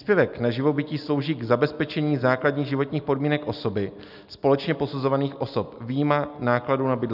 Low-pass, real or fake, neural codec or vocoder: 5.4 kHz; real; none